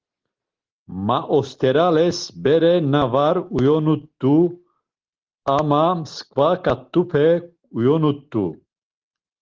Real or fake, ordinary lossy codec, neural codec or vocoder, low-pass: real; Opus, 16 kbps; none; 7.2 kHz